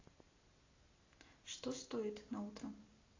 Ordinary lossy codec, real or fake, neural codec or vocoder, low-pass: AAC, 32 kbps; real; none; 7.2 kHz